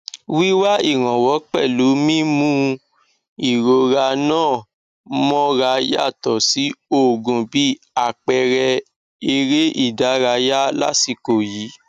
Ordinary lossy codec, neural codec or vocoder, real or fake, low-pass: none; none; real; 14.4 kHz